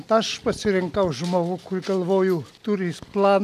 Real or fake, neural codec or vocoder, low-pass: real; none; 14.4 kHz